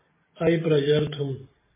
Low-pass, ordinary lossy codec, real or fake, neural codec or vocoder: 3.6 kHz; MP3, 16 kbps; real; none